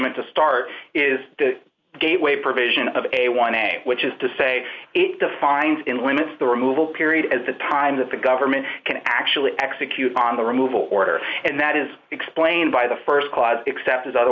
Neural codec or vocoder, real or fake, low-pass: none; real; 7.2 kHz